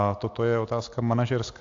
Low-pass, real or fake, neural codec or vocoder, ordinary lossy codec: 7.2 kHz; real; none; AAC, 48 kbps